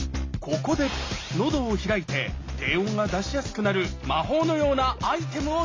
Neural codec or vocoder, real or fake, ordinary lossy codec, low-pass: none; real; AAC, 32 kbps; 7.2 kHz